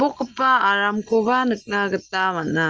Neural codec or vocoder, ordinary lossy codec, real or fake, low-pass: none; Opus, 24 kbps; real; 7.2 kHz